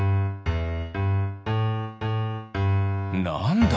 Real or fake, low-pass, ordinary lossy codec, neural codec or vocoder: real; none; none; none